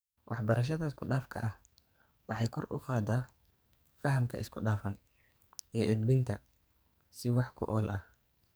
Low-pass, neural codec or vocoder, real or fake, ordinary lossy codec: none; codec, 44.1 kHz, 2.6 kbps, SNAC; fake; none